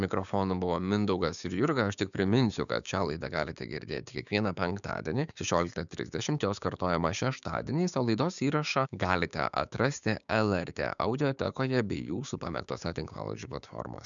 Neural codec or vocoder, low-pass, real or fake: codec, 16 kHz, 6 kbps, DAC; 7.2 kHz; fake